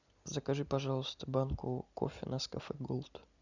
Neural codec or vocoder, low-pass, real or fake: none; 7.2 kHz; real